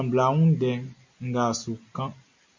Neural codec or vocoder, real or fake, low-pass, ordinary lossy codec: none; real; 7.2 kHz; MP3, 64 kbps